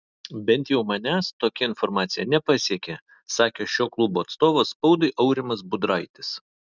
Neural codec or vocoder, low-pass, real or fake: none; 7.2 kHz; real